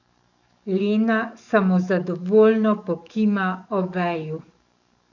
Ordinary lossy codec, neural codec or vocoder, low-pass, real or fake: none; codec, 44.1 kHz, 7.8 kbps, DAC; 7.2 kHz; fake